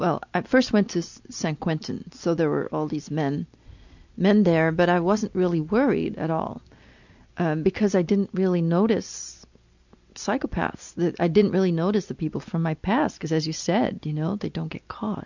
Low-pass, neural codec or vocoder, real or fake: 7.2 kHz; none; real